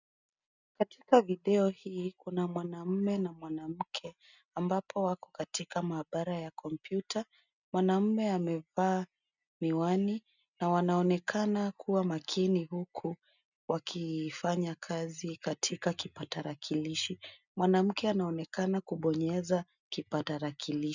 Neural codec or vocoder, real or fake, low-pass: none; real; 7.2 kHz